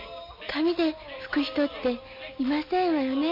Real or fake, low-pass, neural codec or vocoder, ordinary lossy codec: real; 5.4 kHz; none; MP3, 32 kbps